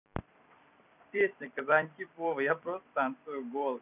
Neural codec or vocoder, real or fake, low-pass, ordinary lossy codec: vocoder, 44.1 kHz, 128 mel bands every 256 samples, BigVGAN v2; fake; 3.6 kHz; none